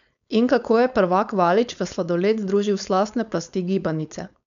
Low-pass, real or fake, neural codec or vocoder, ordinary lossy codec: 7.2 kHz; fake; codec, 16 kHz, 4.8 kbps, FACodec; none